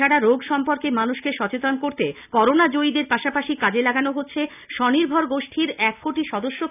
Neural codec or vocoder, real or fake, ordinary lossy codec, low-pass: none; real; none; 3.6 kHz